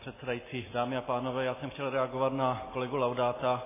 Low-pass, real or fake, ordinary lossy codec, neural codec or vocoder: 3.6 kHz; real; MP3, 16 kbps; none